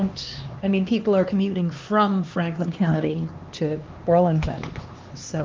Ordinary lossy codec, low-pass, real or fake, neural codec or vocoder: Opus, 24 kbps; 7.2 kHz; fake; codec, 16 kHz, 2 kbps, X-Codec, HuBERT features, trained on LibriSpeech